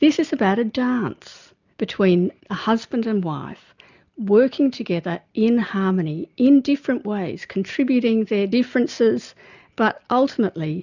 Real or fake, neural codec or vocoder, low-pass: real; none; 7.2 kHz